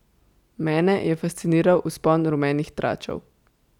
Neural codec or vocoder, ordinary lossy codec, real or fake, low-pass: none; none; real; 19.8 kHz